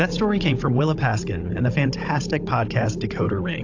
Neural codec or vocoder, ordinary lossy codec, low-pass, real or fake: codec, 16 kHz, 16 kbps, FunCodec, trained on Chinese and English, 50 frames a second; AAC, 48 kbps; 7.2 kHz; fake